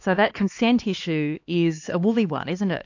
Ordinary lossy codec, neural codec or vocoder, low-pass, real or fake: AAC, 48 kbps; codec, 16 kHz, 2 kbps, X-Codec, HuBERT features, trained on LibriSpeech; 7.2 kHz; fake